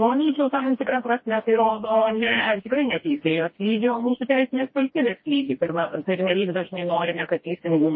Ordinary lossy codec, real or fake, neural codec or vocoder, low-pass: MP3, 24 kbps; fake; codec, 16 kHz, 1 kbps, FreqCodec, smaller model; 7.2 kHz